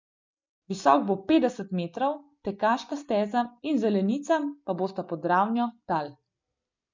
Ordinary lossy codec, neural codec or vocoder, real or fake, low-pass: MP3, 64 kbps; none; real; 7.2 kHz